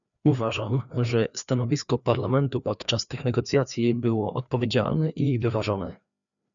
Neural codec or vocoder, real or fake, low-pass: codec, 16 kHz, 2 kbps, FreqCodec, larger model; fake; 7.2 kHz